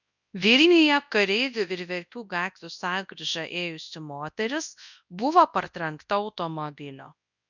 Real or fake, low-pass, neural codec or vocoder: fake; 7.2 kHz; codec, 24 kHz, 0.9 kbps, WavTokenizer, large speech release